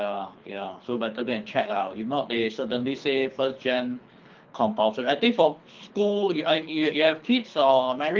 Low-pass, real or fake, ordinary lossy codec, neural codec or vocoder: 7.2 kHz; fake; Opus, 24 kbps; codec, 24 kHz, 3 kbps, HILCodec